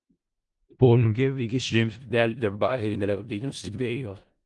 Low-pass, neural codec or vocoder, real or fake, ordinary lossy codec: 10.8 kHz; codec, 16 kHz in and 24 kHz out, 0.4 kbps, LongCat-Audio-Codec, four codebook decoder; fake; Opus, 24 kbps